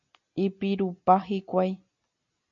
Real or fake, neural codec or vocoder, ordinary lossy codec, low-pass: real; none; MP3, 64 kbps; 7.2 kHz